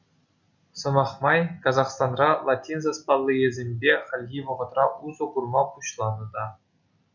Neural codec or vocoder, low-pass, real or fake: none; 7.2 kHz; real